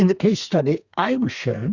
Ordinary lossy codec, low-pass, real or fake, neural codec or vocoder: Opus, 64 kbps; 7.2 kHz; fake; codec, 32 kHz, 1.9 kbps, SNAC